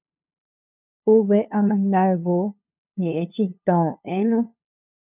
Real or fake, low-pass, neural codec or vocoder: fake; 3.6 kHz; codec, 16 kHz, 2 kbps, FunCodec, trained on LibriTTS, 25 frames a second